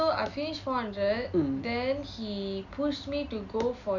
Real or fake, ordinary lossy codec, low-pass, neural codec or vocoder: real; none; 7.2 kHz; none